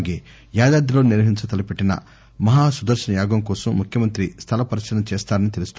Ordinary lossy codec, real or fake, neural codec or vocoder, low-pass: none; real; none; none